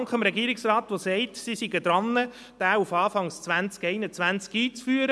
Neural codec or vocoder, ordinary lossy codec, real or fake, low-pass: none; none; real; none